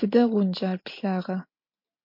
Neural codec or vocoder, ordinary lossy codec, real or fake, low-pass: none; MP3, 32 kbps; real; 5.4 kHz